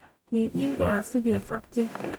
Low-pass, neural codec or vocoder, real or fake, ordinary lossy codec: none; codec, 44.1 kHz, 0.9 kbps, DAC; fake; none